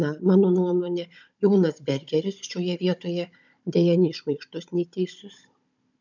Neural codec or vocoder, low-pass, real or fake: codec, 16 kHz, 16 kbps, FunCodec, trained on Chinese and English, 50 frames a second; 7.2 kHz; fake